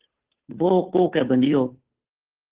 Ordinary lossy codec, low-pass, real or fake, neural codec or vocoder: Opus, 16 kbps; 3.6 kHz; fake; codec, 16 kHz, 2 kbps, FunCodec, trained on Chinese and English, 25 frames a second